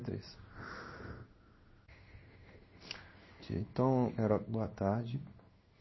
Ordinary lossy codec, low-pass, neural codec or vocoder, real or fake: MP3, 24 kbps; 7.2 kHz; codec, 16 kHz in and 24 kHz out, 1 kbps, XY-Tokenizer; fake